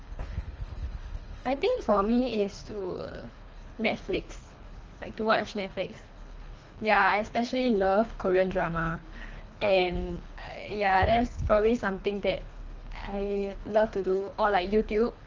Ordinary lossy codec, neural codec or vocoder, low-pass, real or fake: Opus, 24 kbps; codec, 24 kHz, 3 kbps, HILCodec; 7.2 kHz; fake